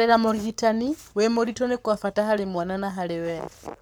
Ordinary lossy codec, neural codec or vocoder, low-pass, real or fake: none; codec, 44.1 kHz, 7.8 kbps, Pupu-Codec; none; fake